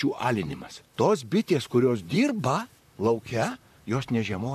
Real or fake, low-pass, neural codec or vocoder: fake; 14.4 kHz; vocoder, 44.1 kHz, 128 mel bands, Pupu-Vocoder